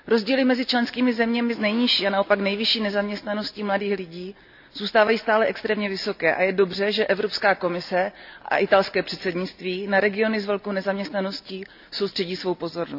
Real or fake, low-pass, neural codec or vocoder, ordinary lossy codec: real; 5.4 kHz; none; none